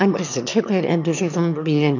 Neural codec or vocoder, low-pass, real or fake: autoencoder, 22.05 kHz, a latent of 192 numbers a frame, VITS, trained on one speaker; 7.2 kHz; fake